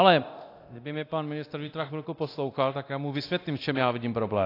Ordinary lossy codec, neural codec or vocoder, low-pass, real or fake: AAC, 32 kbps; codec, 24 kHz, 0.9 kbps, DualCodec; 5.4 kHz; fake